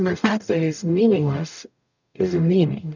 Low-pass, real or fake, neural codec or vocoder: 7.2 kHz; fake; codec, 44.1 kHz, 0.9 kbps, DAC